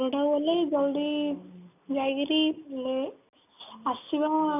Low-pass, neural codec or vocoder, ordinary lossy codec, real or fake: 3.6 kHz; none; none; real